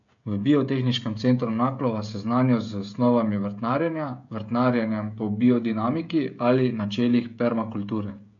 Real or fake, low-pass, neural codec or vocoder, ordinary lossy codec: fake; 7.2 kHz; codec, 16 kHz, 16 kbps, FreqCodec, smaller model; AAC, 64 kbps